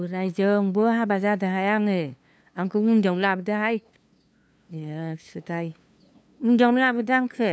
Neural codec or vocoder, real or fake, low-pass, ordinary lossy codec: codec, 16 kHz, 2 kbps, FunCodec, trained on LibriTTS, 25 frames a second; fake; none; none